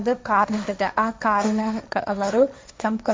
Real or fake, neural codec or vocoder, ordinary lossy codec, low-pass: fake; codec, 16 kHz, 1.1 kbps, Voila-Tokenizer; none; none